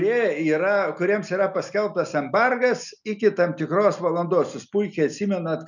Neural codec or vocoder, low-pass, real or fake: none; 7.2 kHz; real